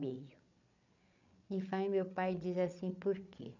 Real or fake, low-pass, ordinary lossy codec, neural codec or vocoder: fake; 7.2 kHz; none; codec, 16 kHz, 8 kbps, FreqCodec, larger model